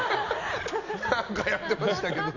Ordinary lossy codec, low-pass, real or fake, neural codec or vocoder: none; 7.2 kHz; real; none